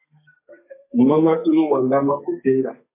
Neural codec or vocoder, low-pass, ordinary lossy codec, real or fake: codec, 32 kHz, 1.9 kbps, SNAC; 3.6 kHz; MP3, 24 kbps; fake